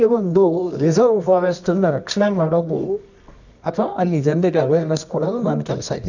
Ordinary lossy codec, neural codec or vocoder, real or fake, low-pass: none; codec, 24 kHz, 0.9 kbps, WavTokenizer, medium music audio release; fake; 7.2 kHz